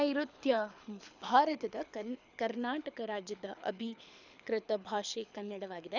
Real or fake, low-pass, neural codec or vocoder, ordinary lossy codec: fake; 7.2 kHz; codec, 24 kHz, 6 kbps, HILCodec; none